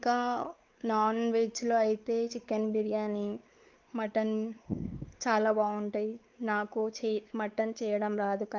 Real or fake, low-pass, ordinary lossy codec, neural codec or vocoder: fake; 7.2 kHz; Opus, 32 kbps; codec, 16 kHz, 4 kbps, X-Codec, WavLM features, trained on Multilingual LibriSpeech